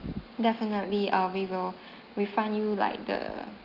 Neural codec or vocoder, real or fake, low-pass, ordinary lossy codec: none; real; 5.4 kHz; Opus, 32 kbps